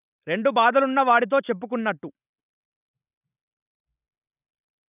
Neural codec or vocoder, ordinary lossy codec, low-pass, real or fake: none; none; 3.6 kHz; real